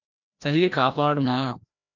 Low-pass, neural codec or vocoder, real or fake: 7.2 kHz; codec, 16 kHz, 1 kbps, FreqCodec, larger model; fake